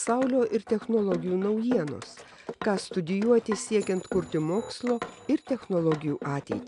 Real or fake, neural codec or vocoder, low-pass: real; none; 10.8 kHz